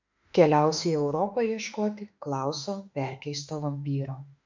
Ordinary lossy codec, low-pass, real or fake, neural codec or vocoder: MP3, 64 kbps; 7.2 kHz; fake; autoencoder, 48 kHz, 32 numbers a frame, DAC-VAE, trained on Japanese speech